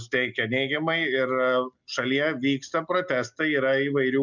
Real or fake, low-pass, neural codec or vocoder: real; 7.2 kHz; none